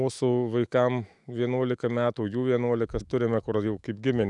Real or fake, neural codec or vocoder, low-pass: fake; autoencoder, 48 kHz, 128 numbers a frame, DAC-VAE, trained on Japanese speech; 10.8 kHz